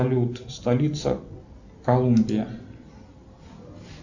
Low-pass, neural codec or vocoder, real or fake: 7.2 kHz; none; real